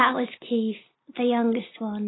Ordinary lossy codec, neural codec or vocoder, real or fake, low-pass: AAC, 16 kbps; none; real; 7.2 kHz